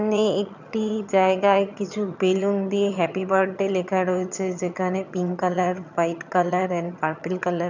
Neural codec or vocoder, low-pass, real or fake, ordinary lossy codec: vocoder, 22.05 kHz, 80 mel bands, HiFi-GAN; 7.2 kHz; fake; none